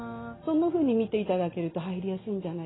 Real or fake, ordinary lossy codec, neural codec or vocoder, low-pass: real; AAC, 16 kbps; none; 7.2 kHz